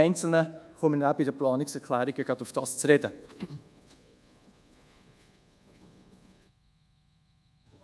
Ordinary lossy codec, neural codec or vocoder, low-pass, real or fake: none; codec, 24 kHz, 1.2 kbps, DualCodec; none; fake